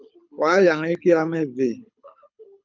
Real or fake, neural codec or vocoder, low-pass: fake; codec, 24 kHz, 6 kbps, HILCodec; 7.2 kHz